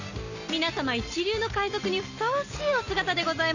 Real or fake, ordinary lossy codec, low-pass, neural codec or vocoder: real; none; 7.2 kHz; none